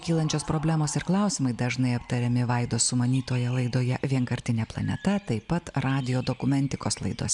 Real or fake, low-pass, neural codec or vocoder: real; 10.8 kHz; none